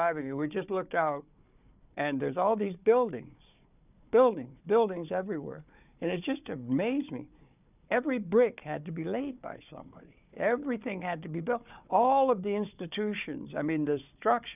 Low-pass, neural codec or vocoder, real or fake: 3.6 kHz; codec, 16 kHz, 4 kbps, FunCodec, trained on Chinese and English, 50 frames a second; fake